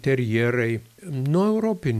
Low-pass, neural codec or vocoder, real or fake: 14.4 kHz; none; real